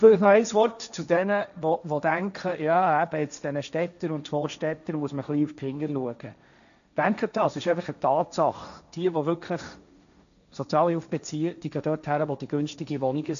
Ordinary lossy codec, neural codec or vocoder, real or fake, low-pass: none; codec, 16 kHz, 1.1 kbps, Voila-Tokenizer; fake; 7.2 kHz